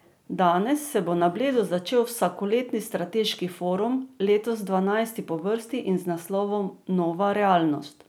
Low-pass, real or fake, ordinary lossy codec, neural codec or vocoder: none; real; none; none